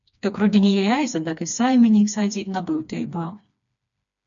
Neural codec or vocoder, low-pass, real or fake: codec, 16 kHz, 2 kbps, FreqCodec, smaller model; 7.2 kHz; fake